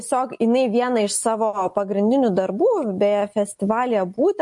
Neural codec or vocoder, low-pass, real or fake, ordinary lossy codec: none; 10.8 kHz; real; MP3, 48 kbps